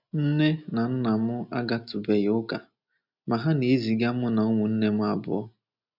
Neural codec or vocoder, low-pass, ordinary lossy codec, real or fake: none; 5.4 kHz; none; real